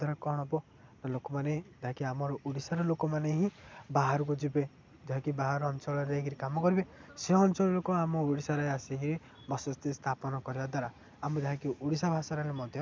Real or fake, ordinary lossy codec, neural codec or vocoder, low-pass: real; none; none; none